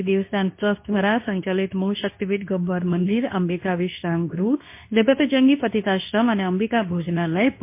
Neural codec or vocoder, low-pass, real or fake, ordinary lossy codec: codec, 24 kHz, 0.9 kbps, WavTokenizer, medium speech release version 2; 3.6 kHz; fake; MP3, 24 kbps